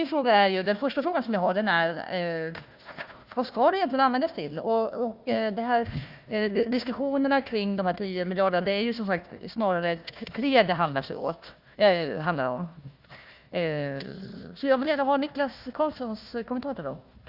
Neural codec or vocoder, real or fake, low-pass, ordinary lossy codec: codec, 16 kHz, 1 kbps, FunCodec, trained on Chinese and English, 50 frames a second; fake; 5.4 kHz; Opus, 64 kbps